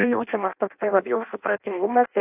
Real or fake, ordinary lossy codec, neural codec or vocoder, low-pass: fake; AAC, 24 kbps; codec, 16 kHz in and 24 kHz out, 0.6 kbps, FireRedTTS-2 codec; 3.6 kHz